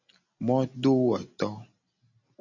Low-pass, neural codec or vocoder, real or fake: 7.2 kHz; none; real